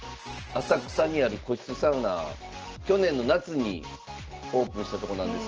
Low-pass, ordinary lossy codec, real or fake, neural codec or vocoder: 7.2 kHz; Opus, 16 kbps; real; none